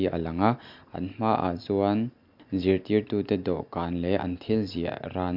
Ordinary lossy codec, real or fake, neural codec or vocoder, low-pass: MP3, 48 kbps; real; none; 5.4 kHz